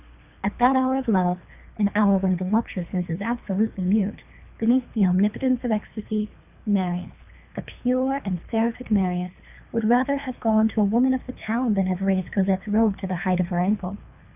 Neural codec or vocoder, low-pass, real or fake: codec, 24 kHz, 3 kbps, HILCodec; 3.6 kHz; fake